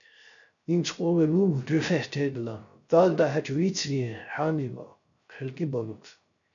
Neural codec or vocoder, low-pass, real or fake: codec, 16 kHz, 0.3 kbps, FocalCodec; 7.2 kHz; fake